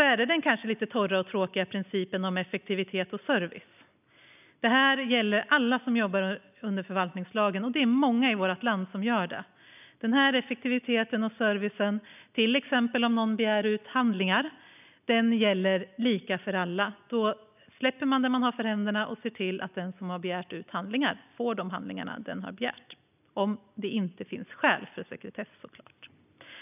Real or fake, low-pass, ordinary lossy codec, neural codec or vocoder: real; 3.6 kHz; none; none